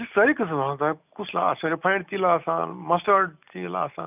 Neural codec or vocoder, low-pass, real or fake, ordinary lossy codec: none; 3.6 kHz; real; none